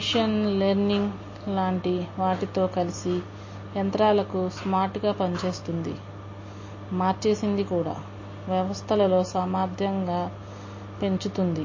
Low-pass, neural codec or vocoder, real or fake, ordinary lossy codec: 7.2 kHz; none; real; MP3, 32 kbps